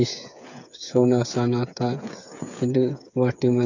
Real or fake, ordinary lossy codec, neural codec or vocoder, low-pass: fake; none; codec, 16 kHz, 8 kbps, FreqCodec, smaller model; 7.2 kHz